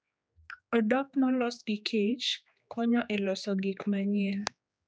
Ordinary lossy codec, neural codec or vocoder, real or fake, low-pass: none; codec, 16 kHz, 2 kbps, X-Codec, HuBERT features, trained on general audio; fake; none